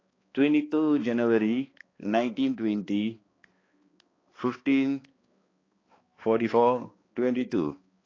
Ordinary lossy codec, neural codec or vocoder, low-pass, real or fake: AAC, 32 kbps; codec, 16 kHz, 2 kbps, X-Codec, HuBERT features, trained on balanced general audio; 7.2 kHz; fake